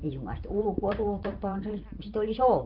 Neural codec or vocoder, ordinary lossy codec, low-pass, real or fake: vocoder, 44.1 kHz, 128 mel bands every 512 samples, BigVGAN v2; Opus, 24 kbps; 5.4 kHz; fake